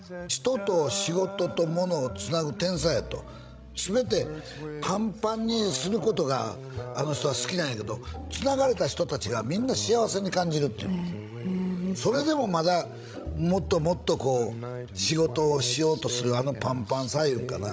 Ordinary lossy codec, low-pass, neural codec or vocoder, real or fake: none; none; codec, 16 kHz, 16 kbps, FreqCodec, larger model; fake